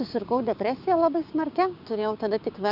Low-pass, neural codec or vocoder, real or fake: 5.4 kHz; codec, 16 kHz, 6 kbps, DAC; fake